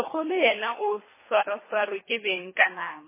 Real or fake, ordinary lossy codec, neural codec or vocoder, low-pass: fake; MP3, 16 kbps; codec, 24 kHz, 3 kbps, HILCodec; 3.6 kHz